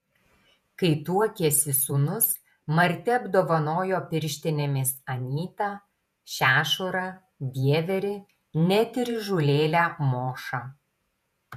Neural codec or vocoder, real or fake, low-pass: vocoder, 48 kHz, 128 mel bands, Vocos; fake; 14.4 kHz